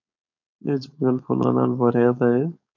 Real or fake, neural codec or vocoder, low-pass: fake; codec, 16 kHz, 4.8 kbps, FACodec; 7.2 kHz